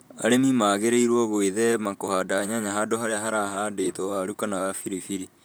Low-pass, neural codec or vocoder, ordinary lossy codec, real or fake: none; vocoder, 44.1 kHz, 128 mel bands, Pupu-Vocoder; none; fake